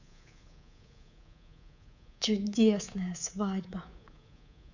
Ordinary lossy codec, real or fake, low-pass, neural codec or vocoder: none; fake; 7.2 kHz; codec, 24 kHz, 3.1 kbps, DualCodec